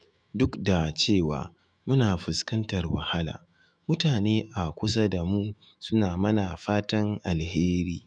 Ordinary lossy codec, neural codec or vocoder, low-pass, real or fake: none; autoencoder, 48 kHz, 128 numbers a frame, DAC-VAE, trained on Japanese speech; 9.9 kHz; fake